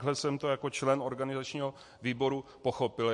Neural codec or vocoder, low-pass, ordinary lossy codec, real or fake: none; 10.8 kHz; MP3, 48 kbps; real